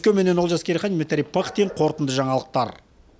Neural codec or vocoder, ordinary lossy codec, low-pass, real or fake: none; none; none; real